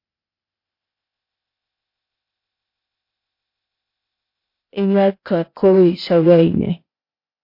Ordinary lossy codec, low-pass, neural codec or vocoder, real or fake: MP3, 32 kbps; 5.4 kHz; codec, 16 kHz, 0.8 kbps, ZipCodec; fake